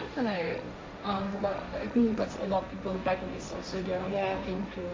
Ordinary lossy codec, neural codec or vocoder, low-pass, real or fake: none; codec, 16 kHz, 1.1 kbps, Voila-Tokenizer; none; fake